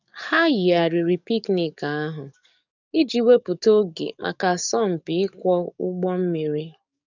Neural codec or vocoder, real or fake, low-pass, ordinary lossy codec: codec, 44.1 kHz, 7.8 kbps, DAC; fake; 7.2 kHz; none